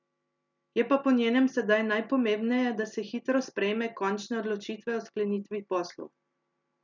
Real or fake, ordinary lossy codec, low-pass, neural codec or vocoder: real; none; 7.2 kHz; none